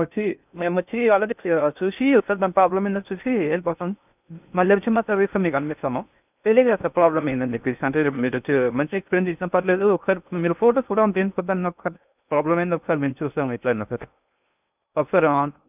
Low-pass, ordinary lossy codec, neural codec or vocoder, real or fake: 3.6 kHz; none; codec, 16 kHz in and 24 kHz out, 0.6 kbps, FocalCodec, streaming, 2048 codes; fake